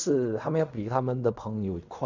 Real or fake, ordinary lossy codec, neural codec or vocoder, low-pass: fake; none; codec, 16 kHz in and 24 kHz out, 0.4 kbps, LongCat-Audio-Codec, fine tuned four codebook decoder; 7.2 kHz